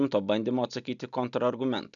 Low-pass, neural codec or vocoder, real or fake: 7.2 kHz; none; real